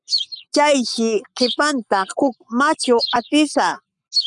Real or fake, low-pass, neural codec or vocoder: fake; 10.8 kHz; codec, 44.1 kHz, 7.8 kbps, Pupu-Codec